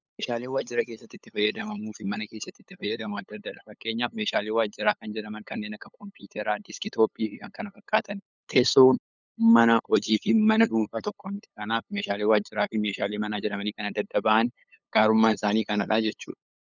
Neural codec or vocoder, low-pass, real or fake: codec, 16 kHz, 8 kbps, FunCodec, trained on LibriTTS, 25 frames a second; 7.2 kHz; fake